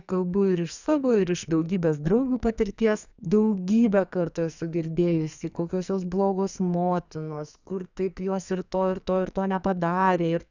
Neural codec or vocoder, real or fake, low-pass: codec, 44.1 kHz, 2.6 kbps, SNAC; fake; 7.2 kHz